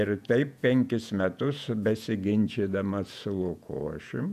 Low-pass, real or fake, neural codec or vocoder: 14.4 kHz; fake; vocoder, 48 kHz, 128 mel bands, Vocos